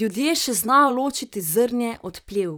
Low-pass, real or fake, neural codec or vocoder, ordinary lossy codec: none; fake; vocoder, 44.1 kHz, 128 mel bands, Pupu-Vocoder; none